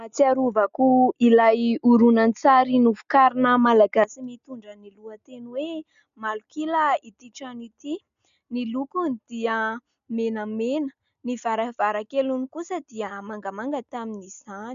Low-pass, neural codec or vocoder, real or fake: 7.2 kHz; none; real